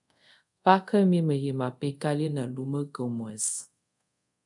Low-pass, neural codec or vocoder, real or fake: 10.8 kHz; codec, 24 kHz, 0.5 kbps, DualCodec; fake